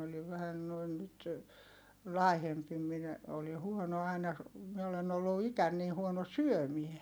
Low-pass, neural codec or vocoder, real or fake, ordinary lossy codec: none; none; real; none